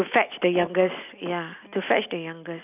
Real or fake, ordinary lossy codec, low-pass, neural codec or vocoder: real; none; 3.6 kHz; none